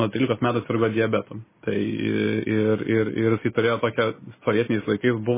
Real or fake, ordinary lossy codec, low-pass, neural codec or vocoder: real; MP3, 16 kbps; 3.6 kHz; none